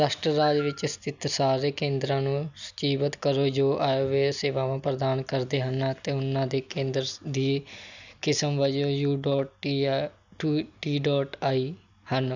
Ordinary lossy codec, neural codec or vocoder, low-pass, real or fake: none; none; 7.2 kHz; real